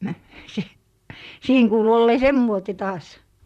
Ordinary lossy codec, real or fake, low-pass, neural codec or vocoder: none; fake; 14.4 kHz; vocoder, 44.1 kHz, 128 mel bands, Pupu-Vocoder